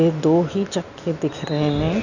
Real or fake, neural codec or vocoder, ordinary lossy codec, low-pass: real; none; none; 7.2 kHz